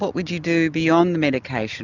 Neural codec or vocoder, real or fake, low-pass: none; real; 7.2 kHz